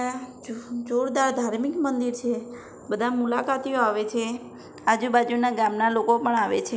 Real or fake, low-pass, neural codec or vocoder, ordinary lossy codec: real; none; none; none